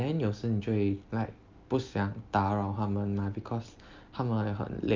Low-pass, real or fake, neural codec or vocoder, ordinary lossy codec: 7.2 kHz; real; none; Opus, 32 kbps